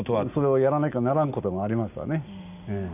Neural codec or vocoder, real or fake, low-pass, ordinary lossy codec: none; real; 3.6 kHz; none